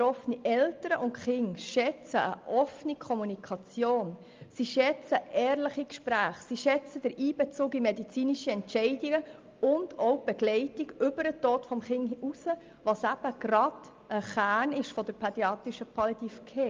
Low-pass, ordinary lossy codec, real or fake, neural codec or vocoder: 7.2 kHz; Opus, 32 kbps; real; none